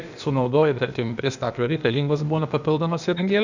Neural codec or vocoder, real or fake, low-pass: codec, 16 kHz, 0.8 kbps, ZipCodec; fake; 7.2 kHz